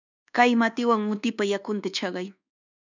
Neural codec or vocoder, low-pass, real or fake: codec, 24 kHz, 1.2 kbps, DualCodec; 7.2 kHz; fake